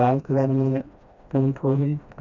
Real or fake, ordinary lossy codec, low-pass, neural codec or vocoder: fake; Opus, 64 kbps; 7.2 kHz; codec, 16 kHz, 1 kbps, FreqCodec, smaller model